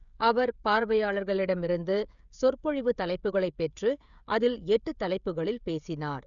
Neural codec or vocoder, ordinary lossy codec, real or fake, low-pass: codec, 16 kHz, 16 kbps, FreqCodec, smaller model; none; fake; 7.2 kHz